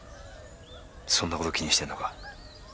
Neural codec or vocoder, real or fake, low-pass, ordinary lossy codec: none; real; none; none